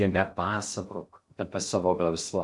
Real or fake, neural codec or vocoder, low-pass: fake; codec, 16 kHz in and 24 kHz out, 0.6 kbps, FocalCodec, streaming, 2048 codes; 10.8 kHz